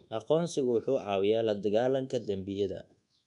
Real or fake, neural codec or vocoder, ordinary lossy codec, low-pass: fake; codec, 24 kHz, 1.2 kbps, DualCodec; none; 10.8 kHz